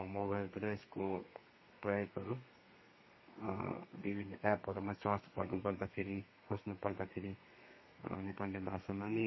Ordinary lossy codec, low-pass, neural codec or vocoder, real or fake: MP3, 24 kbps; 7.2 kHz; codec, 32 kHz, 1.9 kbps, SNAC; fake